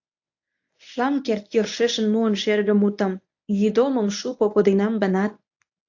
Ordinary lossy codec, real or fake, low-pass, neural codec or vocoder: AAC, 48 kbps; fake; 7.2 kHz; codec, 24 kHz, 0.9 kbps, WavTokenizer, medium speech release version 1